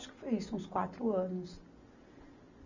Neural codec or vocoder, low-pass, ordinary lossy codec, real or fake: none; 7.2 kHz; none; real